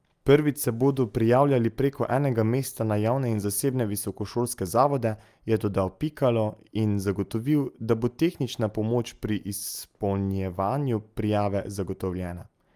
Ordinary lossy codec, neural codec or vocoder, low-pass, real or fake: Opus, 32 kbps; none; 14.4 kHz; real